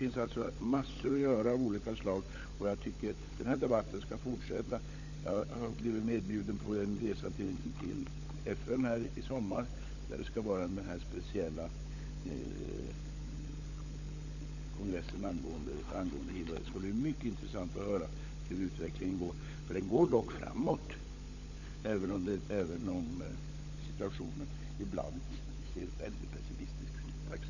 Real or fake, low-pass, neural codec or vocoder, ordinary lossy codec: fake; 7.2 kHz; codec, 16 kHz, 16 kbps, FunCodec, trained on LibriTTS, 50 frames a second; none